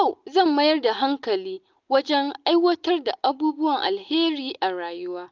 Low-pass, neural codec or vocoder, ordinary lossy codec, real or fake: 7.2 kHz; none; Opus, 24 kbps; real